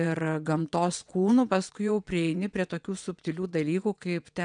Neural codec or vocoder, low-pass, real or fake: vocoder, 22.05 kHz, 80 mel bands, WaveNeXt; 9.9 kHz; fake